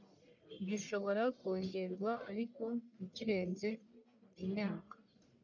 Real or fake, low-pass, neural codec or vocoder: fake; 7.2 kHz; codec, 44.1 kHz, 1.7 kbps, Pupu-Codec